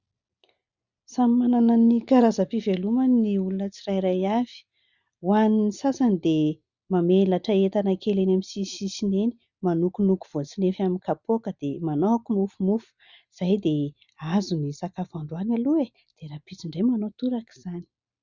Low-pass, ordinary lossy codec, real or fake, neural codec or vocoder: 7.2 kHz; Opus, 64 kbps; real; none